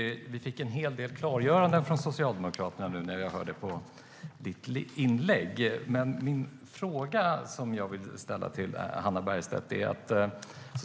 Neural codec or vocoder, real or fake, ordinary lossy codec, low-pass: none; real; none; none